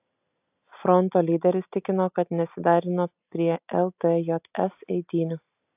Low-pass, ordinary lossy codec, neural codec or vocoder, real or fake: 3.6 kHz; AAC, 32 kbps; none; real